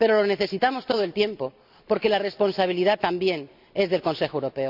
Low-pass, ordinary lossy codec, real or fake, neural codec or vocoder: 5.4 kHz; none; real; none